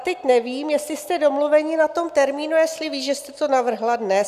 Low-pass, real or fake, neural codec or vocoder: 14.4 kHz; real; none